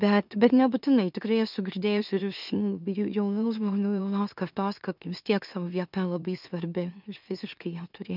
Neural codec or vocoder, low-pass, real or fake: autoencoder, 44.1 kHz, a latent of 192 numbers a frame, MeloTTS; 5.4 kHz; fake